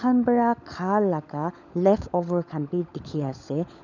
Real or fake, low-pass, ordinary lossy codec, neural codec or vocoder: fake; 7.2 kHz; none; codec, 16 kHz, 16 kbps, FunCodec, trained on LibriTTS, 50 frames a second